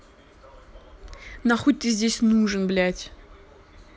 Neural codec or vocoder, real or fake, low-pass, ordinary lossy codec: none; real; none; none